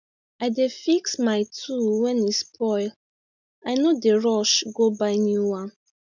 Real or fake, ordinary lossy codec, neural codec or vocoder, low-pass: real; none; none; 7.2 kHz